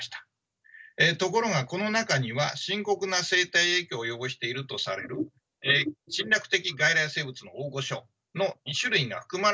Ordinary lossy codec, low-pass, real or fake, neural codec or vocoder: none; none; real; none